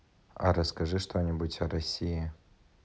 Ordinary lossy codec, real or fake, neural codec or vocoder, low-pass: none; real; none; none